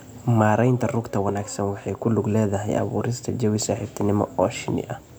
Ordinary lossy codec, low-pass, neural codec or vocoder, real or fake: none; none; none; real